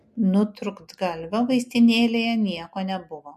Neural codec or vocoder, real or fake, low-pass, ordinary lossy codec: none; real; 14.4 kHz; MP3, 64 kbps